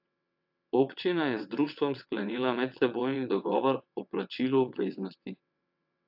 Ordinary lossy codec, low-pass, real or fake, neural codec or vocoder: AAC, 48 kbps; 5.4 kHz; fake; vocoder, 22.05 kHz, 80 mel bands, WaveNeXt